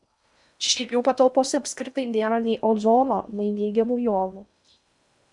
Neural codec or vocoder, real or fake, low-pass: codec, 16 kHz in and 24 kHz out, 0.6 kbps, FocalCodec, streaming, 4096 codes; fake; 10.8 kHz